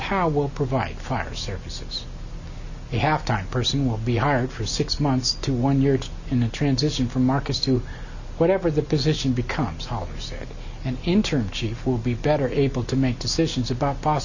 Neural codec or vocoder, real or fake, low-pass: none; real; 7.2 kHz